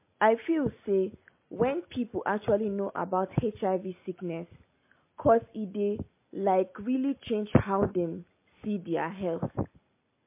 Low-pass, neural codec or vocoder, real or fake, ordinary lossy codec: 3.6 kHz; none; real; MP3, 24 kbps